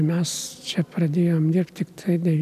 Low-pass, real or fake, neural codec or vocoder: 14.4 kHz; real; none